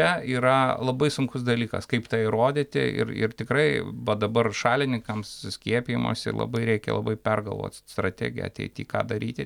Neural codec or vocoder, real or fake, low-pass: none; real; 19.8 kHz